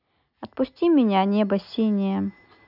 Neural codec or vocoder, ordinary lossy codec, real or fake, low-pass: none; none; real; 5.4 kHz